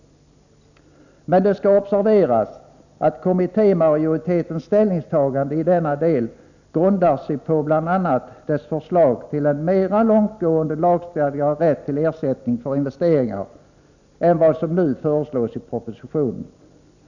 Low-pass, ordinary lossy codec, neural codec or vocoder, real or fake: 7.2 kHz; none; none; real